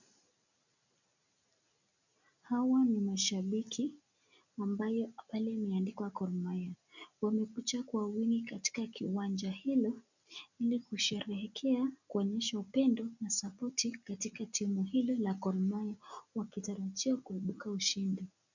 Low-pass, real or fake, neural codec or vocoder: 7.2 kHz; real; none